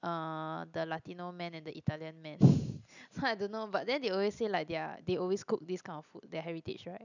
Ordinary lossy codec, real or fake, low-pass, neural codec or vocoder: none; real; 7.2 kHz; none